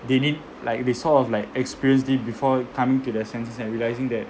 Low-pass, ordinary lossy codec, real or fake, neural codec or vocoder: none; none; real; none